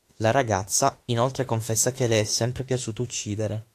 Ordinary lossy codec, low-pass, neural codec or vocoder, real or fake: AAC, 64 kbps; 14.4 kHz; autoencoder, 48 kHz, 32 numbers a frame, DAC-VAE, trained on Japanese speech; fake